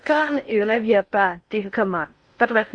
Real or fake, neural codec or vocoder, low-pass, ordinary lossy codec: fake; codec, 16 kHz in and 24 kHz out, 0.6 kbps, FocalCodec, streaming, 4096 codes; 9.9 kHz; Opus, 64 kbps